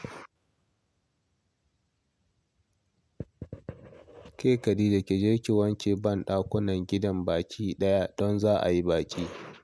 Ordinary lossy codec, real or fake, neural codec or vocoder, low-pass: none; real; none; none